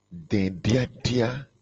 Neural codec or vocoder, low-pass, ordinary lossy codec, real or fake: none; 7.2 kHz; Opus, 24 kbps; real